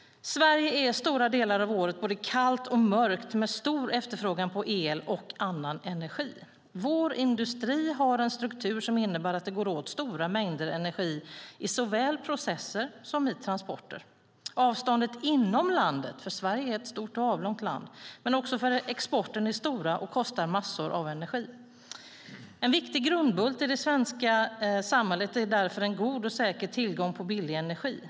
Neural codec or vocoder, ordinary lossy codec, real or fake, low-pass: none; none; real; none